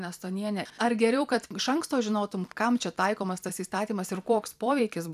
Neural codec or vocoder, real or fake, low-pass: vocoder, 44.1 kHz, 128 mel bands every 256 samples, BigVGAN v2; fake; 14.4 kHz